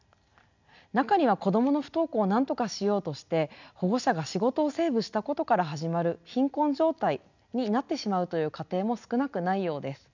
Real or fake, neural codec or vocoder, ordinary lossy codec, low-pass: real; none; none; 7.2 kHz